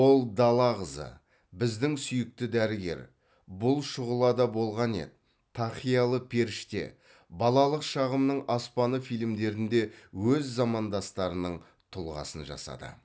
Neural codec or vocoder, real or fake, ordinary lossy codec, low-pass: none; real; none; none